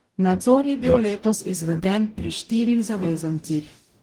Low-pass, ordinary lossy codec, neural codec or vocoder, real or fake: 19.8 kHz; Opus, 24 kbps; codec, 44.1 kHz, 0.9 kbps, DAC; fake